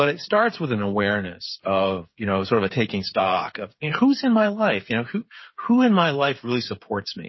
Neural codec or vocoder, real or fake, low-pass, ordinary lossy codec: codec, 16 kHz, 8 kbps, FreqCodec, smaller model; fake; 7.2 kHz; MP3, 24 kbps